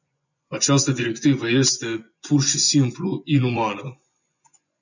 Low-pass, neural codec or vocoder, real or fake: 7.2 kHz; vocoder, 24 kHz, 100 mel bands, Vocos; fake